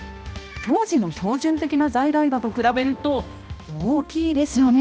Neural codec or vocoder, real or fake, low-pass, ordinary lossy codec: codec, 16 kHz, 1 kbps, X-Codec, HuBERT features, trained on balanced general audio; fake; none; none